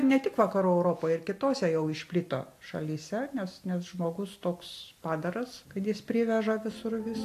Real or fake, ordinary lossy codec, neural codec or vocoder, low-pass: real; AAC, 64 kbps; none; 14.4 kHz